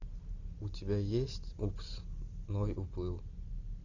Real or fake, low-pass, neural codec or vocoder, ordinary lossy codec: real; 7.2 kHz; none; Opus, 64 kbps